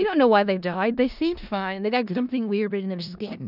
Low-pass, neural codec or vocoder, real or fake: 5.4 kHz; codec, 16 kHz in and 24 kHz out, 0.4 kbps, LongCat-Audio-Codec, four codebook decoder; fake